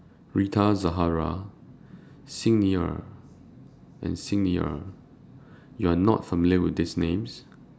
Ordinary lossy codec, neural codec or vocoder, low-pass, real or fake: none; none; none; real